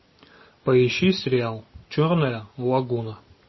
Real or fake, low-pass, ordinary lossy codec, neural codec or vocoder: real; 7.2 kHz; MP3, 24 kbps; none